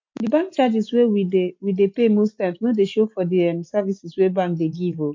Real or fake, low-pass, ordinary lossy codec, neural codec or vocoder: real; 7.2 kHz; MP3, 48 kbps; none